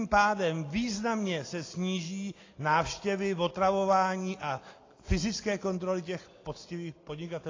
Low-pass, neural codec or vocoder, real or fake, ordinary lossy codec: 7.2 kHz; none; real; AAC, 32 kbps